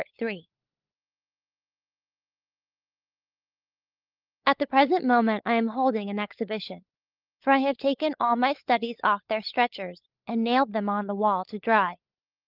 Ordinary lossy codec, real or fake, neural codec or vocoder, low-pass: Opus, 32 kbps; fake; codec, 16 kHz, 16 kbps, FunCodec, trained on LibriTTS, 50 frames a second; 5.4 kHz